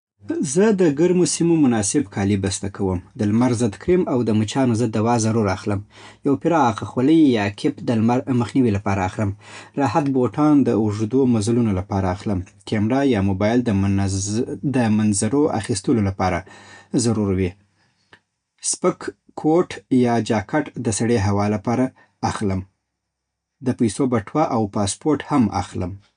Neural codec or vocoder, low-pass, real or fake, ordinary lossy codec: none; 9.9 kHz; real; none